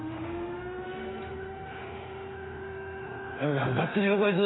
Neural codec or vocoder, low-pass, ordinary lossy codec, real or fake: autoencoder, 48 kHz, 32 numbers a frame, DAC-VAE, trained on Japanese speech; 7.2 kHz; AAC, 16 kbps; fake